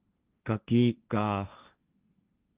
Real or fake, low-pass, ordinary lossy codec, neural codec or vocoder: fake; 3.6 kHz; Opus, 32 kbps; codec, 16 kHz, 1.1 kbps, Voila-Tokenizer